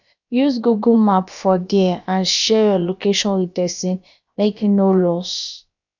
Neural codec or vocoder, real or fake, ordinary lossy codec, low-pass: codec, 16 kHz, about 1 kbps, DyCAST, with the encoder's durations; fake; none; 7.2 kHz